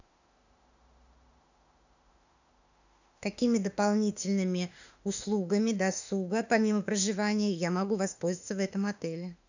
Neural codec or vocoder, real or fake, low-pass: autoencoder, 48 kHz, 32 numbers a frame, DAC-VAE, trained on Japanese speech; fake; 7.2 kHz